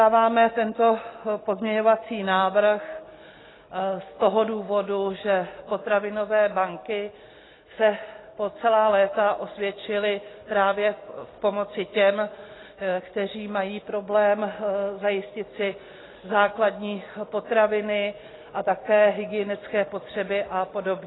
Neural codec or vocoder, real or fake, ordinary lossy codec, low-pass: none; real; AAC, 16 kbps; 7.2 kHz